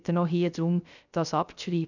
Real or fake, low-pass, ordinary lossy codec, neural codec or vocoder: fake; 7.2 kHz; none; codec, 16 kHz, 0.3 kbps, FocalCodec